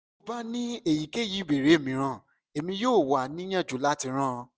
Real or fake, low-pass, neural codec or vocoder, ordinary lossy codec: real; none; none; none